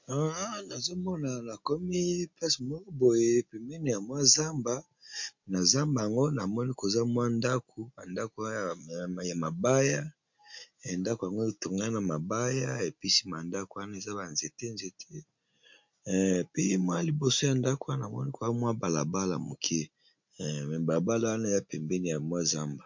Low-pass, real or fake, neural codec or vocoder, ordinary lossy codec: 7.2 kHz; real; none; MP3, 48 kbps